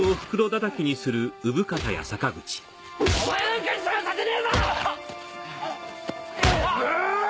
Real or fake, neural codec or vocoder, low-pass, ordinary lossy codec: real; none; none; none